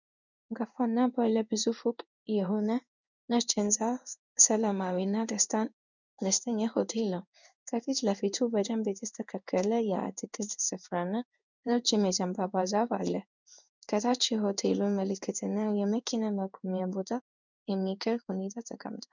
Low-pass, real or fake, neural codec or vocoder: 7.2 kHz; fake; codec, 16 kHz in and 24 kHz out, 1 kbps, XY-Tokenizer